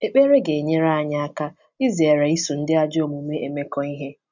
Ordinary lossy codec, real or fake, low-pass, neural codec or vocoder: none; real; 7.2 kHz; none